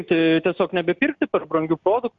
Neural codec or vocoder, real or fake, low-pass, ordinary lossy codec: none; real; 7.2 kHz; Opus, 64 kbps